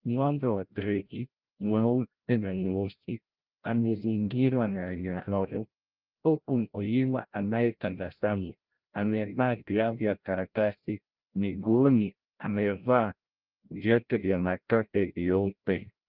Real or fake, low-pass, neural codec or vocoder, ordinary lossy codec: fake; 5.4 kHz; codec, 16 kHz, 0.5 kbps, FreqCodec, larger model; Opus, 32 kbps